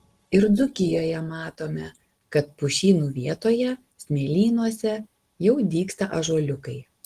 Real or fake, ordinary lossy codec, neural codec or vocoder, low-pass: real; Opus, 16 kbps; none; 14.4 kHz